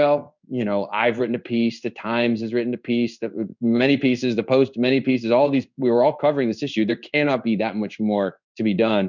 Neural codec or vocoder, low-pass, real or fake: codec, 16 kHz in and 24 kHz out, 1 kbps, XY-Tokenizer; 7.2 kHz; fake